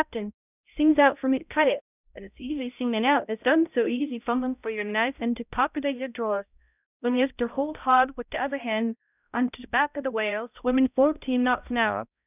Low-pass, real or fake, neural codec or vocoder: 3.6 kHz; fake; codec, 16 kHz, 0.5 kbps, X-Codec, HuBERT features, trained on balanced general audio